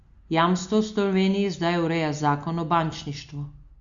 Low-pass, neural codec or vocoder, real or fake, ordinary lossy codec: 7.2 kHz; none; real; Opus, 64 kbps